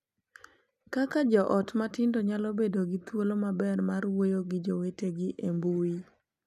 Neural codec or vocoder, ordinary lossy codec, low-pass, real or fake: none; none; 14.4 kHz; real